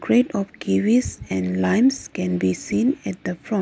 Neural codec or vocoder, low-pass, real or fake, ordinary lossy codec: none; none; real; none